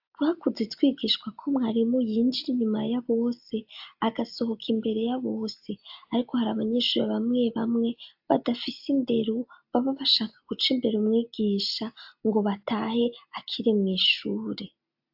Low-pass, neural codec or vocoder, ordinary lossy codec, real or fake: 5.4 kHz; none; AAC, 48 kbps; real